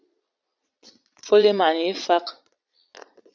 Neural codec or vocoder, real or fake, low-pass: vocoder, 22.05 kHz, 80 mel bands, Vocos; fake; 7.2 kHz